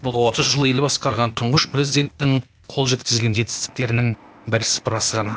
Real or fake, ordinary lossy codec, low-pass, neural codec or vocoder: fake; none; none; codec, 16 kHz, 0.8 kbps, ZipCodec